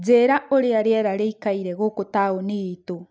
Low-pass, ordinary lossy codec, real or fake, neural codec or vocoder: none; none; real; none